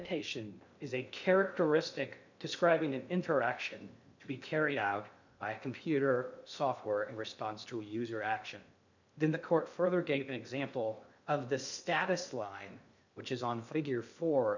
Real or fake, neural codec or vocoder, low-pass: fake; codec, 16 kHz in and 24 kHz out, 0.6 kbps, FocalCodec, streaming, 2048 codes; 7.2 kHz